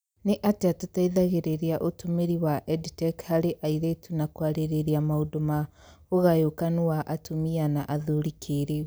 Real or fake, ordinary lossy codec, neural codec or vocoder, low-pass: real; none; none; none